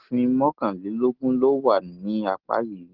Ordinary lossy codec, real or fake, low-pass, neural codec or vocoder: Opus, 16 kbps; real; 5.4 kHz; none